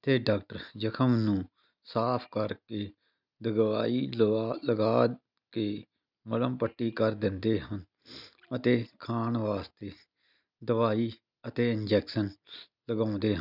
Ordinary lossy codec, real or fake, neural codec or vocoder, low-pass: none; real; none; 5.4 kHz